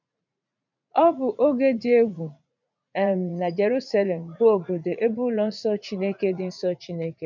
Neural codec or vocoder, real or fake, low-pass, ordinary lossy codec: vocoder, 44.1 kHz, 80 mel bands, Vocos; fake; 7.2 kHz; none